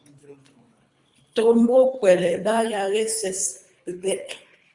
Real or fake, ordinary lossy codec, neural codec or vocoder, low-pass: fake; Opus, 64 kbps; codec, 24 kHz, 3 kbps, HILCodec; 10.8 kHz